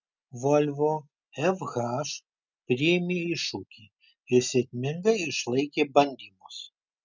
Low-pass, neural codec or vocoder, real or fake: 7.2 kHz; none; real